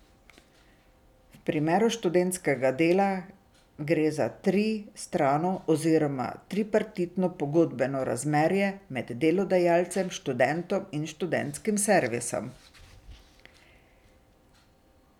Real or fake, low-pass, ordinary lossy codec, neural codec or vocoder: real; 19.8 kHz; none; none